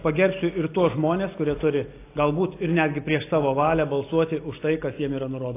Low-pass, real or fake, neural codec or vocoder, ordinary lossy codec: 3.6 kHz; real; none; AAC, 24 kbps